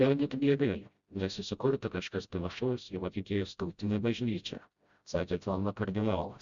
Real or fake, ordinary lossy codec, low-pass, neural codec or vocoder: fake; Opus, 64 kbps; 7.2 kHz; codec, 16 kHz, 0.5 kbps, FreqCodec, smaller model